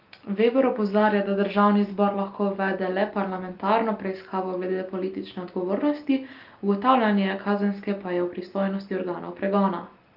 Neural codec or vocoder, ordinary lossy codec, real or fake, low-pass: none; Opus, 24 kbps; real; 5.4 kHz